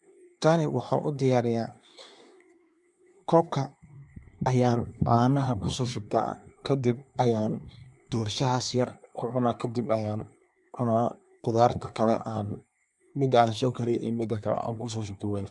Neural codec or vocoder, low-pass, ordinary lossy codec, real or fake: codec, 24 kHz, 1 kbps, SNAC; 10.8 kHz; MP3, 96 kbps; fake